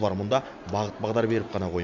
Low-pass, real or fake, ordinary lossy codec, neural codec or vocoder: 7.2 kHz; real; none; none